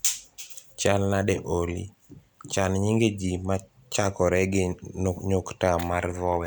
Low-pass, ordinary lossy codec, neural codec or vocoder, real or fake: none; none; none; real